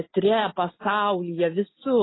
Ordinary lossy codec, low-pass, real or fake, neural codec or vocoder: AAC, 16 kbps; 7.2 kHz; real; none